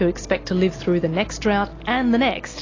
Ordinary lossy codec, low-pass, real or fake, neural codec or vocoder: AAC, 32 kbps; 7.2 kHz; real; none